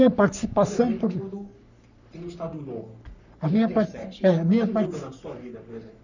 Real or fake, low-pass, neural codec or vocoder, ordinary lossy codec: fake; 7.2 kHz; codec, 44.1 kHz, 3.4 kbps, Pupu-Codec; none